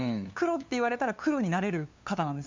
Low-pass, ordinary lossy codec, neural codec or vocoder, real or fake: 7.2 kHz; MP3, 64 kbps; codec, 16 kHz, 2 kbps, FunCodec, trained on LibriTTS, 25 frames a second; fake